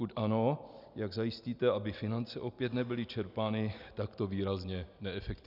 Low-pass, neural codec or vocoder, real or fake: 5.4 kHz; none; real